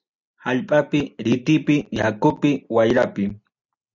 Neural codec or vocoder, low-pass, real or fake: none; 7.2 kHz; real